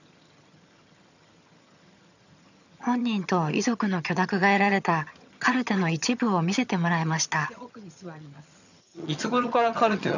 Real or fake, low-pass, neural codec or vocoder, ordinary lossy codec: fake; 7.2 kHz; vocoder, 22.05 kHz, 80 mel bands, HiFi-GAN; none